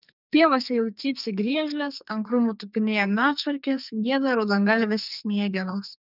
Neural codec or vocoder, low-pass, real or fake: codec, 44.1 kHz, 2.6 kbps, SNAC; 5.4 kHz; fake